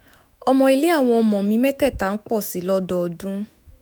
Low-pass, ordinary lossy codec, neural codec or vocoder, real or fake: none; none; autoencoder, 48 kHz, 128 numbers a frame, DAC-VAE, trained on Japanese speech; fake